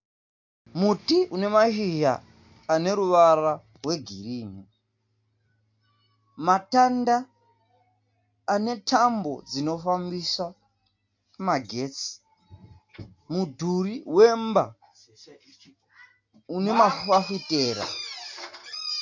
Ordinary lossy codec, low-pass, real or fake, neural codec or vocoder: MP3, 48 kbps; 7.2 kHz; real; none